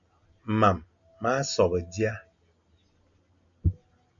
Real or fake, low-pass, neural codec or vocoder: real; 7.2 kHz; none